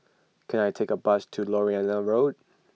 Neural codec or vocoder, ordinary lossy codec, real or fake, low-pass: none; none; real; none